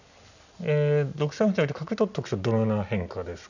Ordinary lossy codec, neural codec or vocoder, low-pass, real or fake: none; none; 7.2 kHz; real